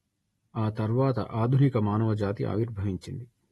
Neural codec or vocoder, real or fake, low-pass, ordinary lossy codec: none; real; 19.8 kHz; AAC, 32 kbps